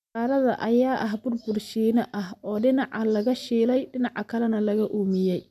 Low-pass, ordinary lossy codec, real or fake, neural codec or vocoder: 14.4 kHz; none; real; none